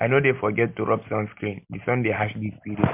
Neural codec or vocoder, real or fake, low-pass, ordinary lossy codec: none; real; 3.6 kHz; MP3, 32 kbps